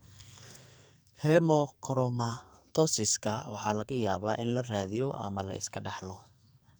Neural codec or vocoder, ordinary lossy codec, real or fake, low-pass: codec, 44.1 kHz, 2.6 kbps, SNAC; none; fake; none